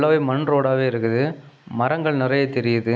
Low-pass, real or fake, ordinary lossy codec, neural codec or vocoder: none; real; none; none